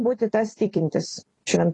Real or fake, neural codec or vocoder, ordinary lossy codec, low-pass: fake; vocoder, 44.1 kHz, 128 mel bands every 256 samples, BigVGAN v2; AAC, 32 kbps; 10.8 kHz